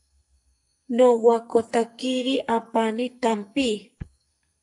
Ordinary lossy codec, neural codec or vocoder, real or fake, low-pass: AAC, 64 kbps; codec, 44.1 kHz, 2.6 kbps, SNAC; fake; 10.8 kHz